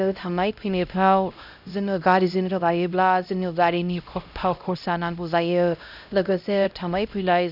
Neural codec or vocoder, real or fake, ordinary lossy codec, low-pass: codec, 16 kHz, 0.5 kbps, X-Codec, HuBERT features, trained on LibriSpeech; fake; none; 5.4 kHz